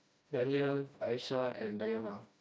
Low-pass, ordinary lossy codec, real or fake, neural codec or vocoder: none; none; fake; codec, 16 kHz, 1 kbps, FreqCodec, smaller model